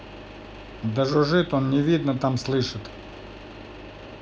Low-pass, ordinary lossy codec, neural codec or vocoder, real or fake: none; none; none; real